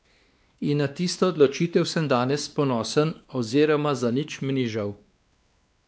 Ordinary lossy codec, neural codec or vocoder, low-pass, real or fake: none; codec, 16 kHz, 2 kbps, X-Codec, WavLM features, trained on Multilingual LibriSpeech; none; fake